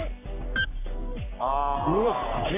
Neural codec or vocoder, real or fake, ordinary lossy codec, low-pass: codec, 44.1 kHz, 3.4 kbps, Pupu-Codec; fake; AAC, 24 kbps; 3.6 kHz